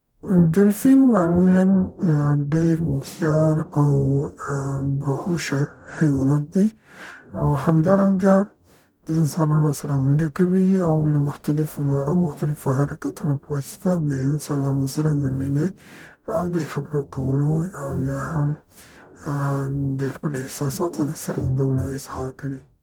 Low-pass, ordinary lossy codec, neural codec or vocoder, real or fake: 19.8 kHz; none; codec, 44.1 kHz, 0.9 kbps, DAC; fake